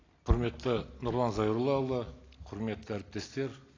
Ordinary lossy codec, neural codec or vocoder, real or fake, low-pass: AAC, 32 kbps; none; real; 7.2 kHz